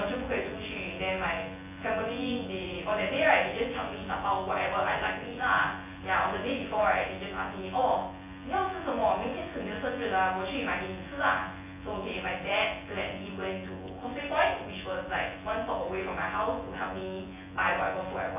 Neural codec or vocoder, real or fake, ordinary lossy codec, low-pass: vocoder, 24 kHz, 100 mel bands, Vocos; fake; AAC, 24 kbps; 3.6 kHz